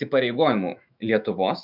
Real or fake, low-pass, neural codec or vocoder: fake; 5.4 kHz; autoencoder, 48 kHz, 128 numbers a frame, DAC-VAE, trained on Japanese speech